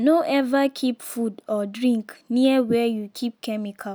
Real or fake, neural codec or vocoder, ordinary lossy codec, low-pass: real; none; none; none